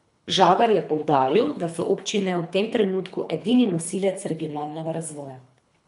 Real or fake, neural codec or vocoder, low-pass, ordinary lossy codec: fake; codec, 24 kHz, 3 kbps, HILCodec; 10.8 kHz; none